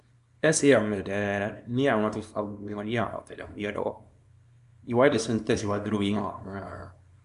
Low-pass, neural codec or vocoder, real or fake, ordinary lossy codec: 10.8 kHz; codec, 24 kHz, 0.9 kbps, WavTokenizer, small release; fake; none